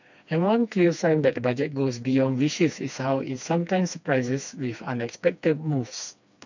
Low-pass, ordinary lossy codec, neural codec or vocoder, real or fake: 7.2 kHz; none; codec, 16 kHz, 2 kbps, FreqCodec, smaller model; fake